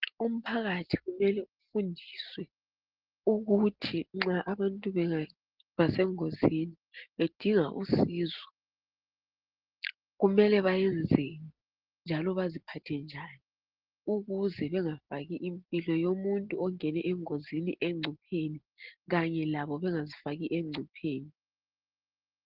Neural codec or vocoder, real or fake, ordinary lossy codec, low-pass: none; real; Opus, 16 kbps; 5.4 kHz